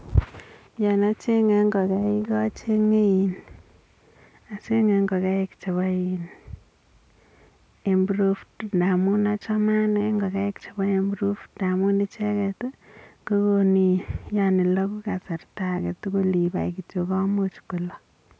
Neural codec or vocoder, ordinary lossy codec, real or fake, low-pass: none; none; real; none